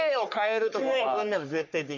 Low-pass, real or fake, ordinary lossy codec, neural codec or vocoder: 7.2 kHz; fake; none; codec, 44.1 kHz, 3.4 kbps, Pupu-Codec